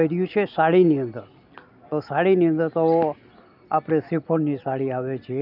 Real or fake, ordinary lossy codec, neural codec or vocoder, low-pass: real; none; none; 5.4 kHz